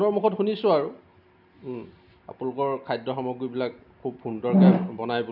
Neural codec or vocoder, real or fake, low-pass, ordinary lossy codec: none; real; 5.4 kHz; none